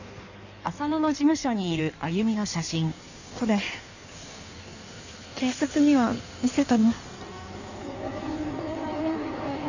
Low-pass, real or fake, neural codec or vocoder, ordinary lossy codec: 7.2 kHz; fake; codec, 16 kHz in and 24 kHz out, 1.1 kbps, FireRedTTS-2 codec; none